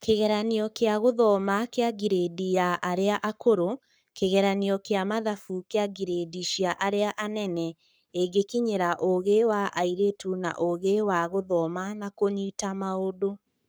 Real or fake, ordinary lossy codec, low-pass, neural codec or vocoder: fake; none; none; codec, 44.1 kHz, 7.8 kbps, Pupu-Codec